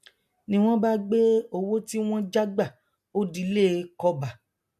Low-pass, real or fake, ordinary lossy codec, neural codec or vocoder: 14.4 kHz; real; MP3, 64 kbps; none